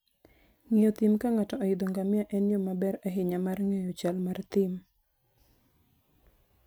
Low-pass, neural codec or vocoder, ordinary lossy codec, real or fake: none; none; none; real